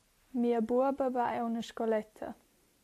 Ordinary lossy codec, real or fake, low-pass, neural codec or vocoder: AAC, 64 kbps; real; 14.4 kHz; none